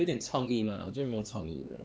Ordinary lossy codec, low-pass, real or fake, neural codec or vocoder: none; none; fake; codec, 16 kHz, 2 kbps, X-Codec, HuBERT features, trained on LibriSpeech